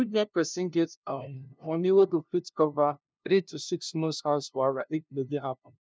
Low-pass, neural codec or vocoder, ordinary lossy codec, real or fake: none; codec, 16 kHz, 0.5 kbps, FunCodec, trained on LibriTTS, 25 frames a second; none; fake